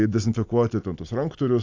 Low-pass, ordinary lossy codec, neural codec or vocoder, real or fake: 7.2 kHz; AAC, 48 kbps; none; real